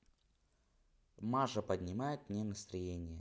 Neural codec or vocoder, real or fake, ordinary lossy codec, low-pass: none; real; none; none